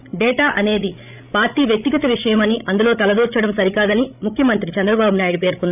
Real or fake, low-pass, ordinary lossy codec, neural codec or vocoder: fake; 3.6 kHz; none; codec, 16 kHz, 16 kbps, FreqCodec, larger model